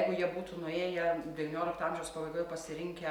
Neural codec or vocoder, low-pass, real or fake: none; 19.8 kHz; real